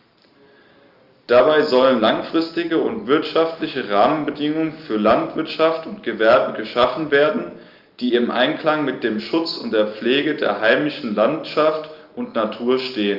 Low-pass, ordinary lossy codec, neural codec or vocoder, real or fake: 5.4 kHz; Opus, 32 kbps; none; real